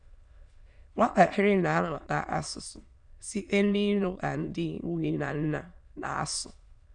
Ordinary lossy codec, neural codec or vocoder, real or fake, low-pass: none; autoencoder, 22.05 kHz, a latent of 192 numbers a frame, VITS, trained on many speakers; fake; 9.9 kHz